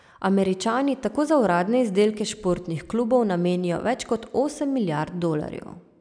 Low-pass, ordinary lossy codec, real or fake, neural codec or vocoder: 9.9 kHz; none; real; none